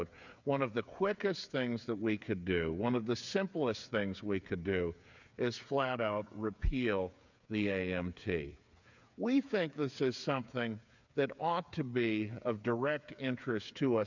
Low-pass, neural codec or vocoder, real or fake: 7.2 kHz; codec, 16 kHz, 8 kbps, FreqCodec, smaller model; fake